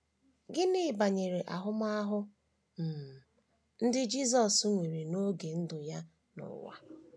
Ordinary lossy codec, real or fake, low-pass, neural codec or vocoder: none; real; none; none